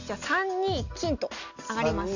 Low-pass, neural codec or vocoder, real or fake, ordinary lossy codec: 7.2 kHz; none; real; Opus, 64 kbps